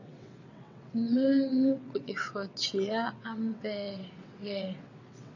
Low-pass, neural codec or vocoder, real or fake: 7.2 kHz; vocoder, 22.05 kHz, 80 mel bands, WaveNeXt; fake